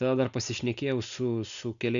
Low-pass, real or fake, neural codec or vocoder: 7.2 kHz; real; none